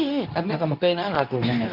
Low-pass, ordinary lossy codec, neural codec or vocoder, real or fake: 5.4 kHz; none; codec, 24 kHz, 0.9 kbps, WavTokenizer, medium speech release version 2; fake